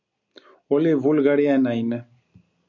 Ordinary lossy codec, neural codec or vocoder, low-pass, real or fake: MP3, 64 kbps; none; 7.2 kHz; real